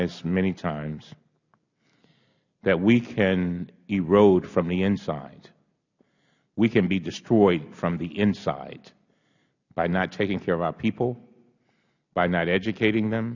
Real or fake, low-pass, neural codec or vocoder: real; 7.2 kHz; none